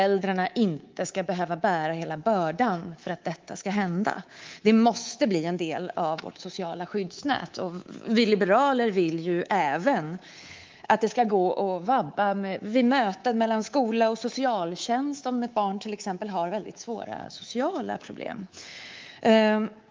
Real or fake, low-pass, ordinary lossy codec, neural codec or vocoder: fake; 7.2 kHz; Opus, 32 kbps; codec, 24 kHz, 3.1 kbps, DualCodec